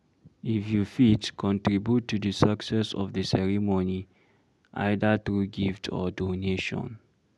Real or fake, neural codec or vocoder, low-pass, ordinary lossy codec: real; none; none; none